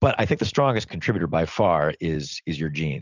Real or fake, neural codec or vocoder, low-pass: real; none; 7.2 kHz